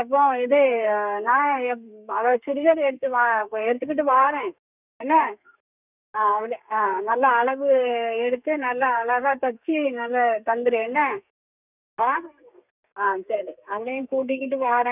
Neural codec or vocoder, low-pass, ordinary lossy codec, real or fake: codec, 32 kHz, 1.9 kbps, SNAC; 3.6 kHz; none; fake